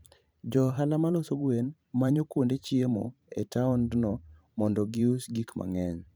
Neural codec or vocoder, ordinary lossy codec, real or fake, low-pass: vocoder, 44.1 kHz, 128 mel bands every 512 samples, BigVGAN v2; none; fake; none